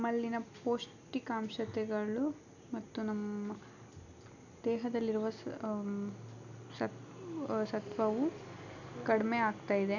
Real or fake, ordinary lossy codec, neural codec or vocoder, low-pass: real; none; none; 7.2 kHz